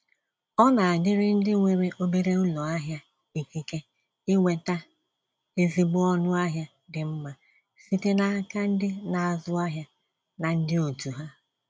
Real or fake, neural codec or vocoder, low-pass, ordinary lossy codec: real; none; none; none